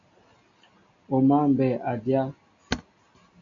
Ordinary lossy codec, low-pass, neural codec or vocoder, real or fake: AAC, 48 kbps; 7.2 kHz; none; real